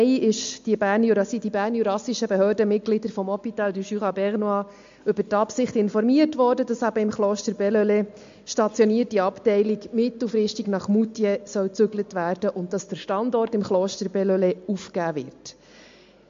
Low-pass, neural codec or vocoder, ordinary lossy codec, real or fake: 7.2 kHz; none; MP3, 48 kbps; real